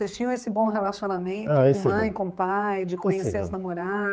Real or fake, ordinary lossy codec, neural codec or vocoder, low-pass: fake; none; codec, 16 kHz, 4 kbps, X-Codec, HuBERT features, trained on general audio; none